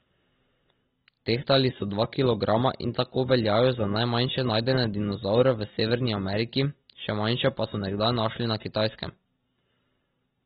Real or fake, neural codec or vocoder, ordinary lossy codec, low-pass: real; none; AAC, 16 kbps; 19.8 kHz